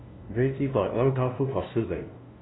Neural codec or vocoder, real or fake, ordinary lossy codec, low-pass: codec, 16 kHz, 0.5 kbps, FunCodec, trained on LibriTTS, 25 frames a second; fake; AAC, 16 kbps; 7.2 kHz